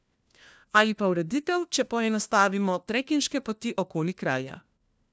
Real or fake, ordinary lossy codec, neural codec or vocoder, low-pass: fake; none; codec, 16 kHz, 1 kbps, FunCodec, trained on LibriTTS, 50 frames a second; none